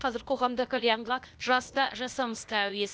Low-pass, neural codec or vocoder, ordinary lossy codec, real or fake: none; codec, 16 kHz, 0.8 kbps, ZipCodec; none; fake